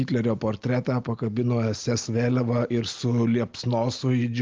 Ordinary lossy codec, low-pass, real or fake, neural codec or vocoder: Opus, 32 kbps; 7.2 kHz; real; none